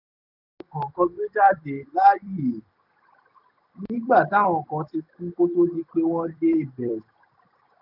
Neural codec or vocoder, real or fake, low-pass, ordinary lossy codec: vocoder, 44.1 kHz, 128 mel bands every 256 samples, BigVGAN v2; fake; 5.4 kHz; none